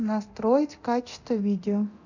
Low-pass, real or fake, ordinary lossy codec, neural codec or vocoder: 7.2 kHz; fake; none; codec, 24 kHz, 0.9 kbps, DualCodec